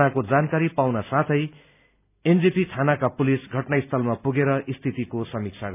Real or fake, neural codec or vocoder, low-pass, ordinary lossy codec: real; none; 3.6 kHz; none